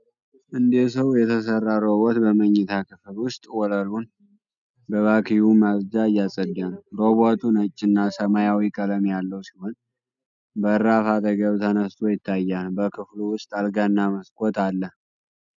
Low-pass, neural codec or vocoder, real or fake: 7.2 kHz; none; real